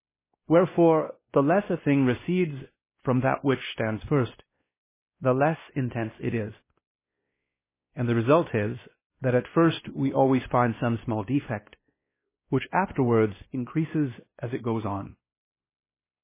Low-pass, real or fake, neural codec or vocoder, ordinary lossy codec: 3.6 kHz; fake; codec, 16 kHz, 1 kbps, X-Codec, WavLM features, trained on Multilingual LibriSpeech; MP3, 16 kbps